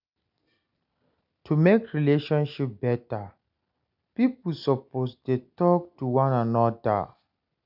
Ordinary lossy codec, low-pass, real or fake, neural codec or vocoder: none; 5.4 kHz; real; none